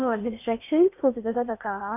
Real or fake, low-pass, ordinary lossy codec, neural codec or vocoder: fake; 3.6 kHz; none; codec, 16 kHz in and 24 kHz out, 0.8 kbps, FocalCodec, streaming, 65536 codes